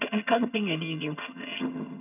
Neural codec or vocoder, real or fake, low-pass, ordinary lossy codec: vocoder, 22.05 kHz, 80 mel bands, HiFi-GAN; fake; 3.6 kHz; none